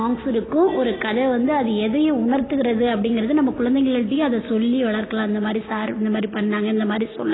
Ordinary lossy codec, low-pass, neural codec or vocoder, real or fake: AAC, 16 kbps; 7.2 kHz; none; real